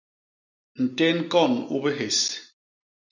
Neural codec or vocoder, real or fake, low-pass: none; real; 7.2 kHz